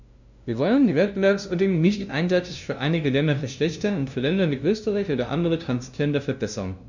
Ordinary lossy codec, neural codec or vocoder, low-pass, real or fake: none; codec, 16 kHz, 0.5 kbps, FunCodec, trained on LibriTTS, 25 frames a second; 7.2 kHz; fake